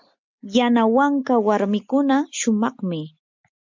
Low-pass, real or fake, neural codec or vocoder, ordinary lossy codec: 7.2 kHz; real; none; MP3, 64 kbps